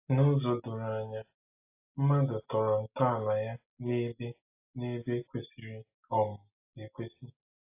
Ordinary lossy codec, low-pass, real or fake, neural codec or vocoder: AAC, 24 kbps; 3.6 kHz; real; none